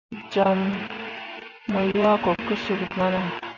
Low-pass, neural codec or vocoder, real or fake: 7.2 kHz; vocoder, 24 kHz, 100 mel bands, Vocos; fake